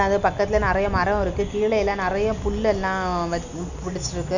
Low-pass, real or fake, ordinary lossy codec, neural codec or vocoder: 7.2 kHz; real; none; none